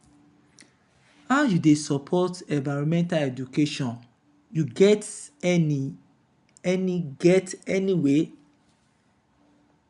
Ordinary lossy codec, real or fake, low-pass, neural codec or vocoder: none; real; 10.8 kHz; none